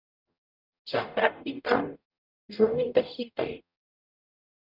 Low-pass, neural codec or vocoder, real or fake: 5.4 kHz; codec, 44.1 kHz, 0.9 kbps, DAC; fake